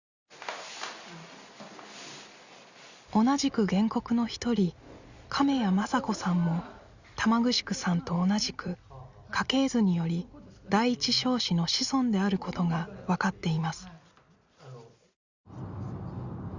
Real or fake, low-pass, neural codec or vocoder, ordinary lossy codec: real; 7.2 kHz; none; Opus, 64 kbps